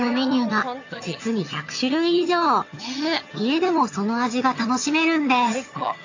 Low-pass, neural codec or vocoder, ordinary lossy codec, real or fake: 7.2 kHz; vocoder, 22.05 kHz, 80 mel bands, HiFi-GAN; AAC, 48 kbps; fake